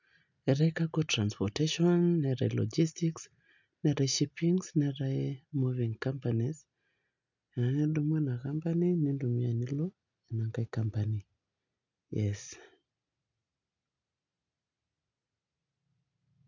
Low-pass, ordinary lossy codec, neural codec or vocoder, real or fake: 7.2 kHz; none; none; real